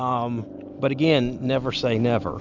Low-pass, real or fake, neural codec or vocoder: 7.2 kHz; real; none